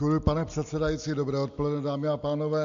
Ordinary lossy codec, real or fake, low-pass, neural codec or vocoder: MP3, 64 kbps; real; 7.2 kHz; none